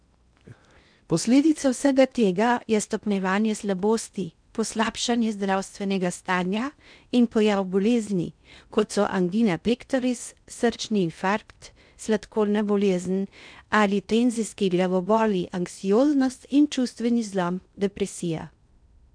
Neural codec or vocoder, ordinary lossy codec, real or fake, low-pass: codec, 16 kHz in and 24 kHz out, 0.6 kbps, FocalCodec, streaming, 2048 codes; none; fake; 9.9 kHz